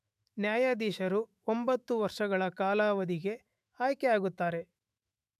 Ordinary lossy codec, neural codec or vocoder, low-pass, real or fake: none; autoencoder, 48 kHz, 128 numbers a frame, DAC-VAE, trained on Japanese speech; 14.4 kHz; fake